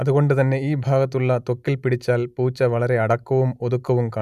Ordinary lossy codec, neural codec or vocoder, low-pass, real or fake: none; none; 14.4 kHz; real